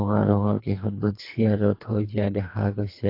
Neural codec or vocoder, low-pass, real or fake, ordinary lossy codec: codec, 24 kHz, 3 kbps, HILCodec; 5.4 kHz; fake; AAC, 32 kbps